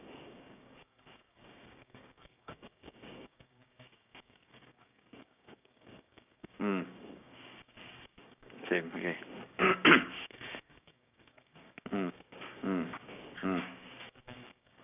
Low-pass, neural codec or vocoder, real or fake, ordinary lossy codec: 3.6 kHz; codec, 16 kHz, 6 kbps, DAC; fake; none